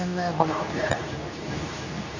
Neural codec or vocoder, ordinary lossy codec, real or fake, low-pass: codec, 24 kHz, 0.9 kbps, WavTokenizer, medium speech release version 2; none; fake; 7.2 kHz